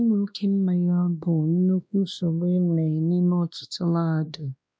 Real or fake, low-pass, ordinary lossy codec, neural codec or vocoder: fake; none; none; codec, 16 kHz, 1 kbps, X-Codec, WavLM features, trained on Multilingual LibriSpeech